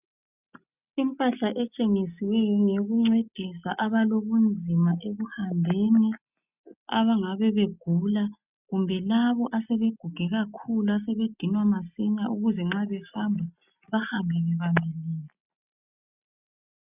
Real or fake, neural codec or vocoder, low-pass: real; none; 3.6 kHz